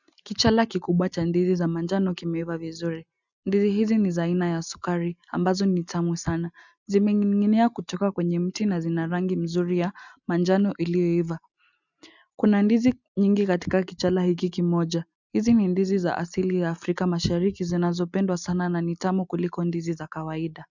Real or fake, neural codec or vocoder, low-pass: real; none; 7.2 kHz